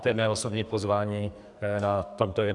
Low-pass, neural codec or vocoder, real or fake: 10.8 kHz; codec, 44.1 kHz, 2.6 kbps, SNAC; fake